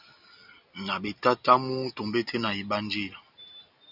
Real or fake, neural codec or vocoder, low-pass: real; none; 5.4 kHz